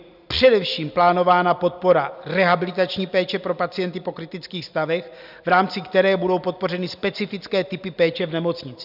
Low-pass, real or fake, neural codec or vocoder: 5.4 kHz; real; none